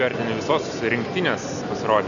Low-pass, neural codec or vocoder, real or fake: 7.2 kHz; none; real